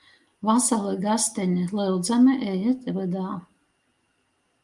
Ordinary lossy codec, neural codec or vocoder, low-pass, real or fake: Opus, 32 kbps; none; 10.8 kHz; real